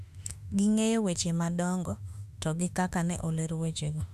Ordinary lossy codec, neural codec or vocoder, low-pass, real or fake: none; autoencoder, 48 kHz, 32 numbers a frame, DAC-VAE, trained on Japanese speech; 14.4 kHz; fake